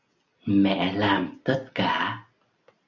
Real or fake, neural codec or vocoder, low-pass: real; none; 7.2 kHz